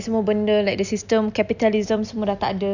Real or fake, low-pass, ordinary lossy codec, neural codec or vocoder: real; 7.2 kHz; none; none